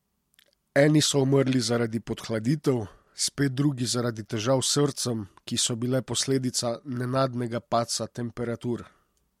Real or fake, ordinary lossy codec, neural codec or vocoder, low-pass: real; MP3, 64 kbps; none; 19.8 kHz